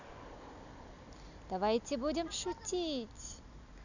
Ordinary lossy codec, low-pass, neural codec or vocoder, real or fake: none; 7.2 kHz; none; real